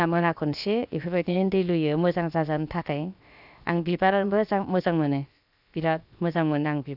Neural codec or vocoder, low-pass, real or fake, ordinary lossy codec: codec, 16 kHz, about 1 kbps, DyCAST, with the encoder's durations; 5.4 kHz; fake; none